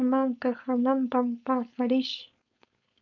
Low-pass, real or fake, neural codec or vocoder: 7.2 kHz; fake; codec, 16 kHz, 4.8 kbps, FACodec